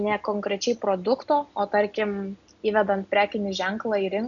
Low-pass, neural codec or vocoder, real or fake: 7.2 kHz; none; real